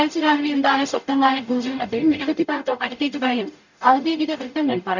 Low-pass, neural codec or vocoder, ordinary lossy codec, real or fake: 7.2 kHz; codec, 44.1 kHz, 0.9 kbps, DAC; none; fake